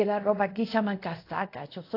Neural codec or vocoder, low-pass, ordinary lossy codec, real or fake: codec, 24 kHz, 0.5 kbps, DualCodec; 5.4 kHz; AAC, 32 kbps; fake